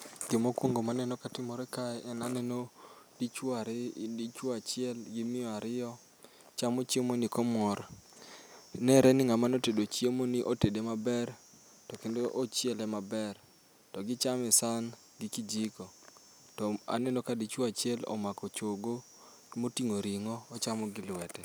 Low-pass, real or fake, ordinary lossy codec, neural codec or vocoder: none; real; none; none